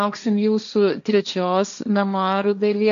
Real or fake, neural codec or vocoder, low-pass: fake; codec, 16 kHz, 1.1 kbps, Voila-Tokenizer; 7.2 kHz